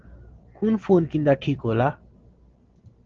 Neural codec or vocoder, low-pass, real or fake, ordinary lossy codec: none; 7.2 kHz; real; Opus, 16 kbps